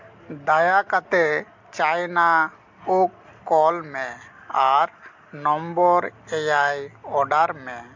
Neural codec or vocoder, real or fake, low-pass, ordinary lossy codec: none; real; 7.2 kHz; MP3, 48 kbps